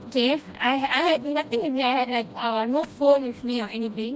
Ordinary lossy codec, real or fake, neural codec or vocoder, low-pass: none; fake; codec, 16 kHz, 1 kbps, FreqCodec, smaller model; none